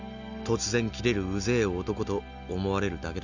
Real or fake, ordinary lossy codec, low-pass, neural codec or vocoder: real; none; 7.2 kHz; none